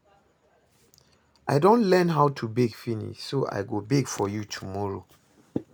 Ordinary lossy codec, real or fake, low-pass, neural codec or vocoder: none; real; none; none